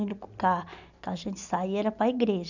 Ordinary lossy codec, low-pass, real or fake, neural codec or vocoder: none; 7.2 kHz; real; none